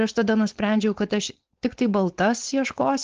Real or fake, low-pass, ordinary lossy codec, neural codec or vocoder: fake; 7.2 kHz; Opus, 16 kbps; codec, 16 kHz, 4.8 kbps, FACodec